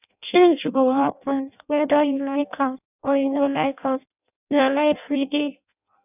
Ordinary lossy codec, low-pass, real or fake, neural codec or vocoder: none; 3.6 kHz; fake; codec, 16 kHz in and 24 kHz out, 0.6 kbps, FireRedTTS-2 codec